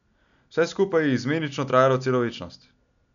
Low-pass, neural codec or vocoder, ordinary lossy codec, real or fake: 7.2 kHz; none; none; real